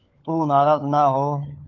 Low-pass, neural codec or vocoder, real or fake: 7.2 kHz; codec, 16 kHz, 4 kbps, FunCodec, trained on LibriTTS, 50 frames a second; fake